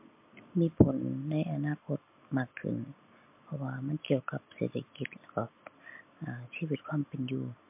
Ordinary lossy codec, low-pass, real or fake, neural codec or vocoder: MP3, 24 kbps; 3.6 kHz; real; none